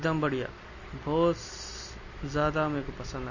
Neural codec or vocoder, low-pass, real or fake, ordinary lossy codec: none; 7.2 kHz; real; MP3, 32 kbps